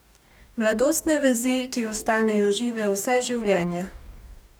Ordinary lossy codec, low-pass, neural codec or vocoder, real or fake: none; none; codec, 44.1 kHz, 2.6 kbps, DAC; fake